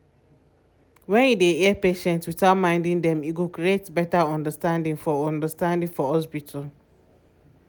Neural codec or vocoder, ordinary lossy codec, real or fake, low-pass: none; none; real; none